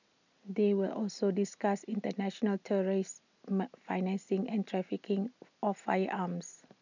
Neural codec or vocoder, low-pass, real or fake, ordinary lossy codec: none; 7.2 kHz; real; none